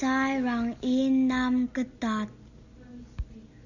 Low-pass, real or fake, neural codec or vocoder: 7.2 kHz; real; none